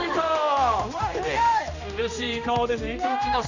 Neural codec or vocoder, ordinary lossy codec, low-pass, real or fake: codec, 16 kHz, 1 kbps, X-Codec, HuBERT features, trained on general audio; none; 7.2 kHz; fake